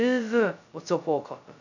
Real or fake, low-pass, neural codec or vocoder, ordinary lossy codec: fake; 7.2 kHz; codec, 16 kHz, 0.2 kbps, FocalCodec; none